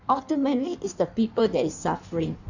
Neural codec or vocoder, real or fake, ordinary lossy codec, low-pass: codec, 16 kHz in and 24 kHz out, 1.1 kbps, FireRedTTS-2 codec; fake; none; 7.2 kHz